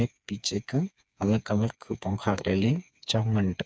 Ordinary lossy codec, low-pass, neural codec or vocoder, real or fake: none; none; codec, 16 kHz, 4 kbps, FreqCodec, smaller model; fake